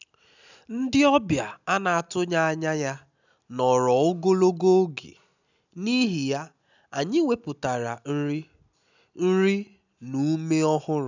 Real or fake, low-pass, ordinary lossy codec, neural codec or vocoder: real; 7.2 kHz; none; none